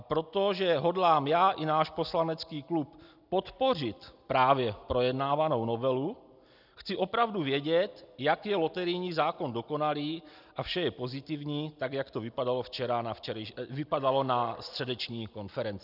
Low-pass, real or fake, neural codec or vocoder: 5.4 kHz; real; none